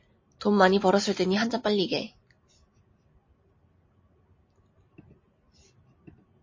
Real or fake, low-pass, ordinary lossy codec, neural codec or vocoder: real; 7.2 kHz; MP3, 32 kbps; none